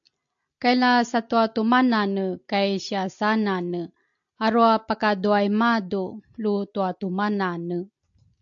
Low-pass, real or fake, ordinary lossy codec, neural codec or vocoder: 7.2 kHz; real; AAC, 64 kbps; none